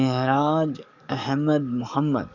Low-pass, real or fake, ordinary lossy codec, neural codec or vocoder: 7.2 kHz; fake; none; codec, 44.1 kHz, 7.8 kbps, DAC